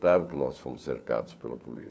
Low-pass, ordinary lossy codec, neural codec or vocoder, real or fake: none; none; codec, 16 kHz, 4 kbps, FunCodec, trained on LibriTTS, 50 frames a second; fake